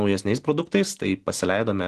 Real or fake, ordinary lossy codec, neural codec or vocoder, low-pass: real; Opus, 16 kbps; none; 10.8 kHz